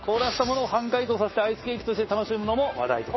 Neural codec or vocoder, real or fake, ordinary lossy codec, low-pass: codec, 16 kHz, 6 kbps, DAC; fake; MP3, 24 kbps; 7.2 kHz